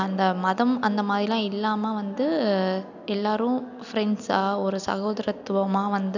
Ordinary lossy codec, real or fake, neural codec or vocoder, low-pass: none; real; none; 7.2 kHz